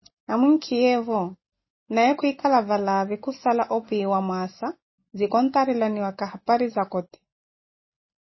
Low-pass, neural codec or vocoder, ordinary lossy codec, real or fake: 7.2 kHz; none; MP3, 24 kbps; real